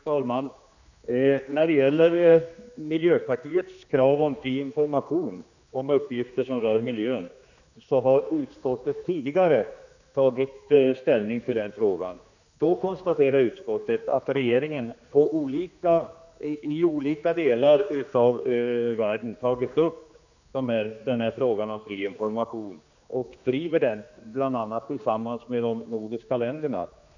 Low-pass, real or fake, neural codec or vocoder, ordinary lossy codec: 7.2 kHz; fake; codec, 16 kHz, 2 kbps, X-Codec, HuBERT features, trained on general audio; none